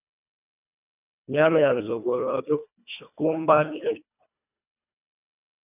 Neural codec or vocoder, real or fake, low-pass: codec, 24 kHz, 1.5 kbps, HILCodec; fake; 3.6 kHz